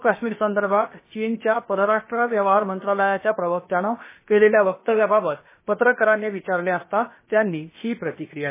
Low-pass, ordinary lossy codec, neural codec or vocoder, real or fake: 3.6 kHz; MP3, 16 kbps; codec, 16 kHz, about 1 kbps, DyCAST, with the encoder's durations; fake